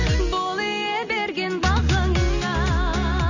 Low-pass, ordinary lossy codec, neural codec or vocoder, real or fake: 7.2 kHz; none; none; real